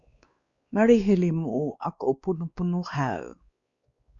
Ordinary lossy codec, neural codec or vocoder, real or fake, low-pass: Opus, 64 kbps; codec, 16 kHz, 2 kbps, X-Codec, WavLM features, trained on Multilingual LibriSpeech; fake; 7.2 kHz